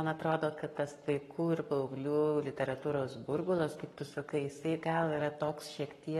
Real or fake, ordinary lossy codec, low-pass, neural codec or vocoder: fake; AAC, 32 kbps; 19.8 kHz; codec, 44.1 kHz, 7.8 kbps, Pupu-Codec